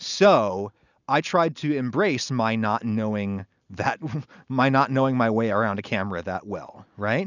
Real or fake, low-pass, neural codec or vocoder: real; 7.2 kHz; none